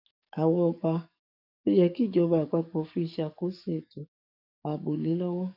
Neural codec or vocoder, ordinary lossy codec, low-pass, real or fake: codec, 44.1 kHz, 7.8 kbps, DAC; none; 5.4 kHz; fake